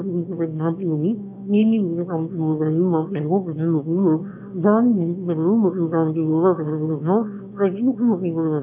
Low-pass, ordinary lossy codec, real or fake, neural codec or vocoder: 3.6 kHz; none; fake; autoencoder, 22.05 kHz, a latent of 192 numbers a frame, VITS, trained on one speaker